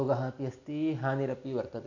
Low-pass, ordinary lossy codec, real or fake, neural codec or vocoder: 7.2 kHz; none; real; none